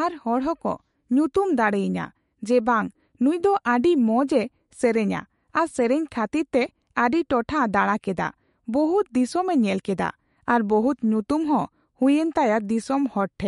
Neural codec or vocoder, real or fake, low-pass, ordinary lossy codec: autoencoder, 48 kHz, 128 numbers a frame, DAC-VAE, trained on Japanese speech; fake; 19.8 kHz; MP3, 48 kbps